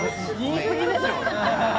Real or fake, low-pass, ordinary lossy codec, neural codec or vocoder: real; none; none; none